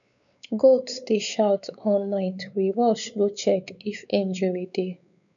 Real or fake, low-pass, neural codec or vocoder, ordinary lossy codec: fake; 7.2 kHz; codec, 16 kHz, 4 kbps, X-Codec, WavLM features, trained on Multilingual LibriSpeech; none